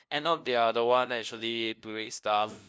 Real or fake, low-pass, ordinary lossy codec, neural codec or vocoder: fake; none; none; codec, 16 kHz, 0.5 kbps, FunCodec, trained on LibriTTS, 25 frames a second